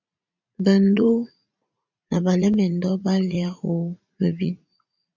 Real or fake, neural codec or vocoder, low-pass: fake; vocoder, 44.1 kHz, 80 mel bands, Vocos; 7.2 kHz